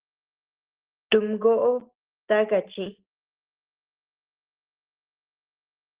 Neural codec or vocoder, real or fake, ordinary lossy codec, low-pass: none; real; Opus, 16 kbps; 3.6 kHz